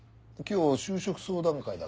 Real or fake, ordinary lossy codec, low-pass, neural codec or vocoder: real; none; none; none